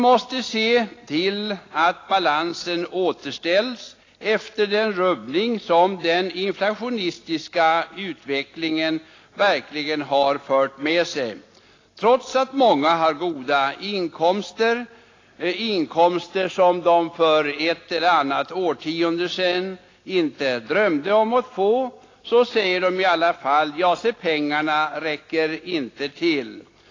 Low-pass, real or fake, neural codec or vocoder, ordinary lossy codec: 7.2 kHz; real; none; AAC, 32 kbps